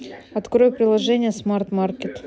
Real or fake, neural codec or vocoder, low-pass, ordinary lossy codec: real; none; none; none